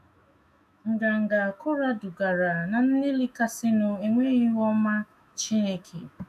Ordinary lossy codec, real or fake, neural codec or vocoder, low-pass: none; fake; autoencoder, 48 kHz, 128 numbers a frame, DAC-VAE, trained on Japanese speech; 14.4 kHz